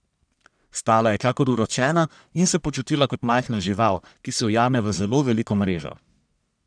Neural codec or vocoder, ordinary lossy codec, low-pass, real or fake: codec, 44.1 kHz, 1.7 kbps, Pupu-Codec; none; 9.9 kHz; fake